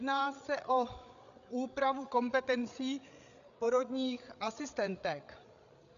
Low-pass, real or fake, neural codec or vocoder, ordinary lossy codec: 7.2 kHz; fake; codec, 16 kHz, 8 kbps, FreqCodec, larger model; MP3, 96 kbps